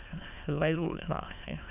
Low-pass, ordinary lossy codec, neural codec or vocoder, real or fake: 3.6 kHz; none; autoencoder, 22.05 kHz, a latent of 192 numbers a frame, VITS, trained on many speakers; fake